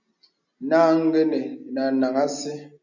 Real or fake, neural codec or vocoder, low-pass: real; none; 7.2 kHz